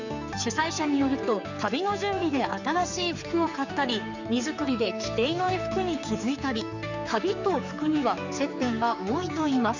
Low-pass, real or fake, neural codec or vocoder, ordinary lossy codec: 7.2 kHz; fake; codec, 16 kHz, 4 kbps, X-Codec, HuBERT features, trained on general audio; none